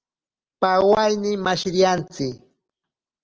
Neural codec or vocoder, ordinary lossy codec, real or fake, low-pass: none; Opus, 24 kbps; real; 7.2 kHz